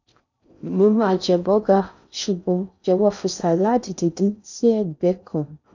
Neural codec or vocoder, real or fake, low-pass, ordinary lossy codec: codec, 16 kHz in and 24 kHz out, 0.6 kbps, FocalCodec, streaming, 4096 codes; fake; 7.2 kHz; none